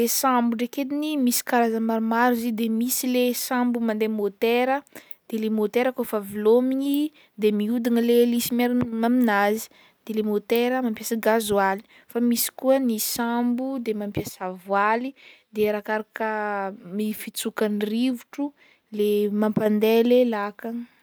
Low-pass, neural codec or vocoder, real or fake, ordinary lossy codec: none; none; real; none